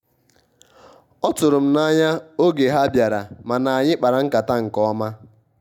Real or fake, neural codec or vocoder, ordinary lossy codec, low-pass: real; none; none; 19.8 kHz